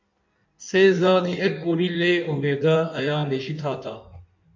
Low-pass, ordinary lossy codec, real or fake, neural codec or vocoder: 7.2 kHz; MP3, 64 kbps; fake; codec, 16 kHz in and 24 kHz out, 1.1 kbps, FireRedTTS-2 codec